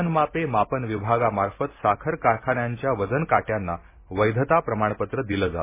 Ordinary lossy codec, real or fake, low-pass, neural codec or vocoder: MP3, 16 kbps; real; 3.6 kHz; none